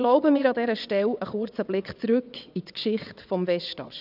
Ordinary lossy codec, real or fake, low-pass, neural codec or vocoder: none; fake; 5.4 kHz; vocoder, 22.05 kHz, 80 mel bands, WaveNeXt